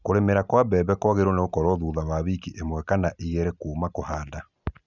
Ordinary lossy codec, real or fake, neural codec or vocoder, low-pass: none; real; none; 7.2 kHz